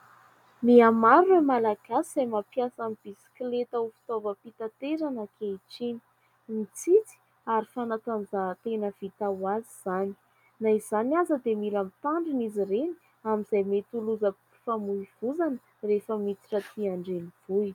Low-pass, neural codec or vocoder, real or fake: 19.8 kHz; none; real